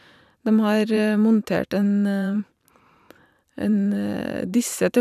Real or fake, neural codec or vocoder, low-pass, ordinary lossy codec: fake; vocoder, 44.1 kHz, 128 mel bands every 512 samples, BigVGAN v2; 14.4 kHz; none